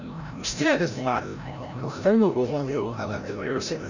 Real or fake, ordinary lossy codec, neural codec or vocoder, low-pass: fake; none; codec, 16 kHz, 0.5 kbps, FreqCodec, larger model; 7.2 kHz